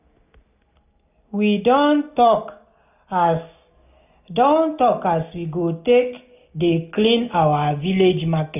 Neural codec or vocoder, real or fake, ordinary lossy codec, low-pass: none; real; none; 3.6 kHz